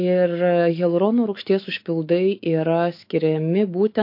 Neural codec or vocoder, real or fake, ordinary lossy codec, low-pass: autoencoder, 48 kHz, 128 numbers a frame, DAC-VAE, trained on Japanese speech; fake; MP3, 32 kbps; 5.4 kHz